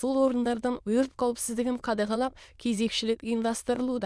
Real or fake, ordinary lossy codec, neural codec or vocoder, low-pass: fake; none; autoencoder, 22.05 kHz, a latent of 192 numbers a frame, VITS, trained on many speakers; none